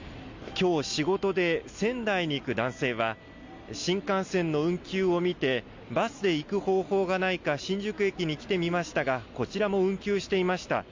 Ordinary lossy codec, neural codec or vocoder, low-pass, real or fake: MP3, 64 kbps; none; 7.2 kHz; real